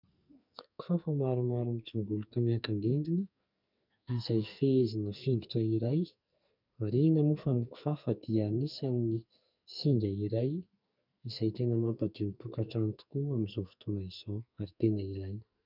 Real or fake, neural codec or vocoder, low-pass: fake; codec, 44.1 kHz, 2.6 kbps, SNAC; 5.4 kHz